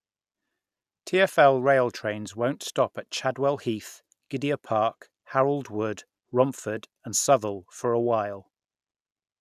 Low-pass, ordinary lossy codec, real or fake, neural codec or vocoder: 14.4 kHz; none; real; none